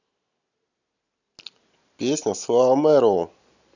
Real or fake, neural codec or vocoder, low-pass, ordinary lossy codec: real; none; 7.2 kHz; none